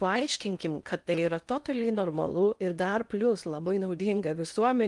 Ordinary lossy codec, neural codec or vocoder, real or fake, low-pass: Opus, 32 kbps; codec, 16 kHz in and 24 kHz out, 0.8 kbps, FocalCodec, streaming, 65536 codes; fake; 10.8 kHz